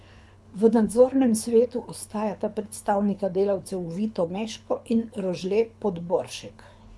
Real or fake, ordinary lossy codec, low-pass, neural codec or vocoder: fake; none; none; codec, 24 kHz, 6 kbps, HILCodec